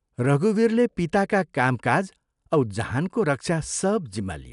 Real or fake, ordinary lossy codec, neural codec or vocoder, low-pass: real; none; none; 10.8 kHz